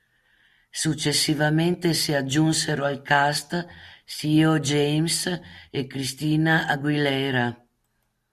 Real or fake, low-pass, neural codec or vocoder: real; 14.4 kHz; none